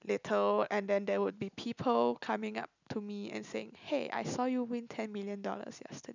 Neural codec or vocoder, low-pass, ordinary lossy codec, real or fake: none; 7.2 kHz; none; real